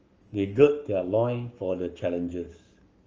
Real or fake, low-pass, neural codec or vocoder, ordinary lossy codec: fake; 7.2 kHz; codec, 44.1 kHz, 7.8 kbps, Pupu-Codec; Opus, 24 kbps